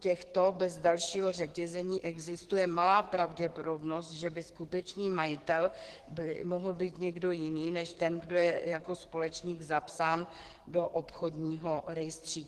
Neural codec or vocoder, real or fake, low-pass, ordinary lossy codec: codec, 44.1 kHz, 2.6 kbps, SNAC; fake; 14.4 kHz; Opus, 16 kbps